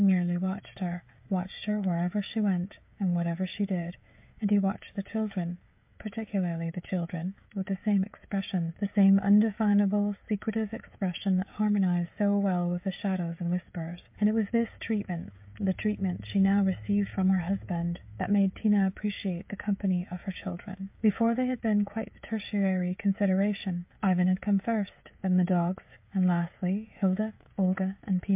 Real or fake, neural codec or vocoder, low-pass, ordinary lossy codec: fake; codec, 16 kHz, 16 kbps, FreqCodec, smaller model; 3.6 kHz; MP3, 32 kbps